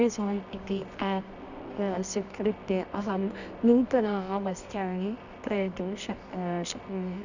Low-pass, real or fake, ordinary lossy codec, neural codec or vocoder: 7.2 kHz; fake; none; codec, 24 kHz, 0.9 kbps, WavTokenizer, medium music audio release